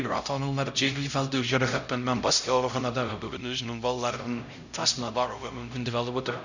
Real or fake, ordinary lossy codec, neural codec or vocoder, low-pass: fake; none; codec, 16 kHz, 0.5 kbps, X-Codec, HuBERT features, trained on LibriSpeech; 7.2 kHz